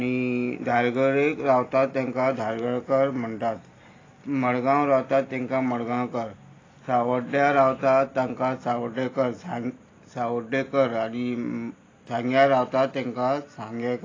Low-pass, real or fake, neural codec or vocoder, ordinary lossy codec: 7.2 kHz; real; none; AAC, 32 kbps